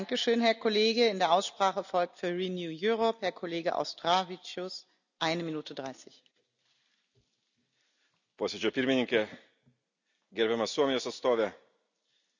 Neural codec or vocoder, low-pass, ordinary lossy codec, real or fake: none; 7.2 kHz; none; real